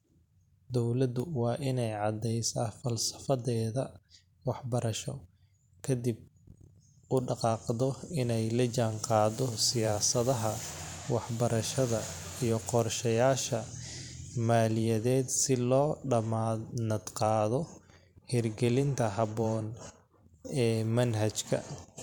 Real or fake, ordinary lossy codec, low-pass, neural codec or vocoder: fake; none; 19.8 kHz; vocoder, 44.1 kHz, 128 mel bands every 512 samples, BigVGAN v2